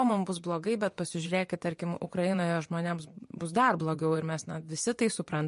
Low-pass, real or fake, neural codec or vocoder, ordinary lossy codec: 10.8 kHz; fake; vocoder, 24 kHz, 100 mel bands, Vocos; MP3, 48 kbps